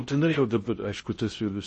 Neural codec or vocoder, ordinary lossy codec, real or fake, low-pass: codec, 16 kHz in and 24 kHz out, 0.6 kbps, FocalCodec, streaming, 2048 codes; MP3, 32 kbps; fake; 10.8 kHz